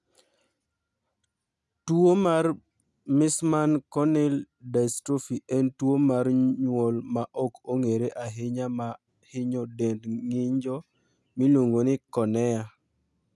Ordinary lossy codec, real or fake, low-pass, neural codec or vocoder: none; real; none; none